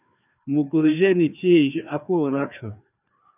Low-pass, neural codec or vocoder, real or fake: 3.6 kHz; codec, 16 kHz, 2 kbps, FreqCodec, larger model; fake